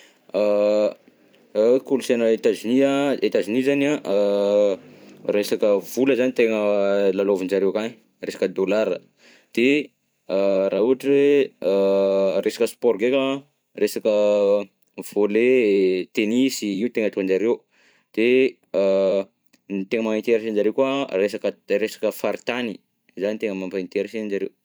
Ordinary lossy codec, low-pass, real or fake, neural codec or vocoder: none; none; fake; vocoder, 44.1 kHz, 128 mel bands every 512 samples, BigVGAN v2